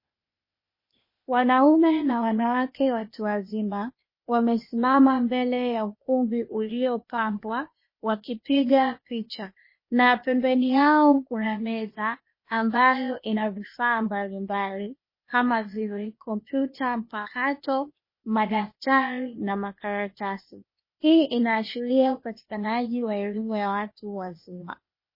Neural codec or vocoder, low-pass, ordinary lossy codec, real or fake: codec, 16 kHz, 0.8 kbps, ZipCodec; 5.4 kHz; MP3, 24 kbps; fake